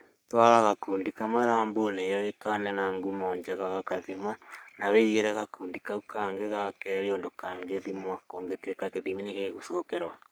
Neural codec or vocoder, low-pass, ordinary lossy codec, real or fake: codec, 44.1 kHz, 3.4 kbps, Pupu-Codec; none; none; fake